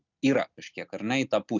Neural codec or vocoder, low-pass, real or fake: none; 7.2 kHz; real